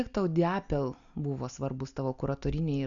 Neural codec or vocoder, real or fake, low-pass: none; real; 7.2 kHz